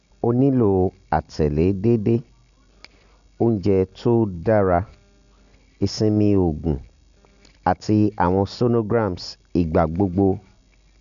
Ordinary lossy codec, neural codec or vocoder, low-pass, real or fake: none; none; 7.2 kHz; real